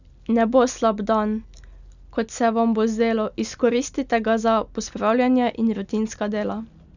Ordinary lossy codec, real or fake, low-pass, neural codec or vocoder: none; real; 7.2 kHz; none